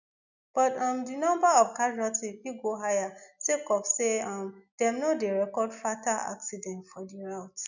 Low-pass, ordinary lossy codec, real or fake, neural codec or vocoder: 7.2 kHz; none; real; none